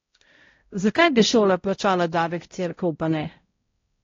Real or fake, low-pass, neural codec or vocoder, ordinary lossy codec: fake; 7.2 kHz; codec, 16 kHz, 0.5 kbps, X-Codec, HuBERT features, trained on balanced general audio; AAC, 32 kbps